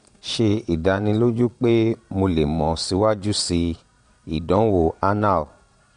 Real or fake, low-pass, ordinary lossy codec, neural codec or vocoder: fake; 9.9 kHz; MP3, 64 kbps; vocoder, 22.05 kHz, 80 mel bands, Vocos